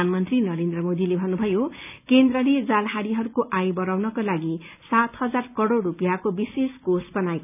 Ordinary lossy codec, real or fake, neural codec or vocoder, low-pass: none; real; none; 3.6 kHz